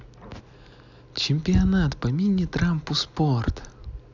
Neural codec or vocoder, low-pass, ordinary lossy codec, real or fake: none; 7.2 kHz; none; real